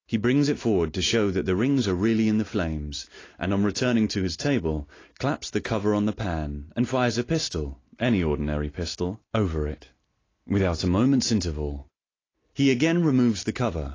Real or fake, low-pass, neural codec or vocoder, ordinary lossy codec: real; 7.2 kHz; none; AAC, 32 kbps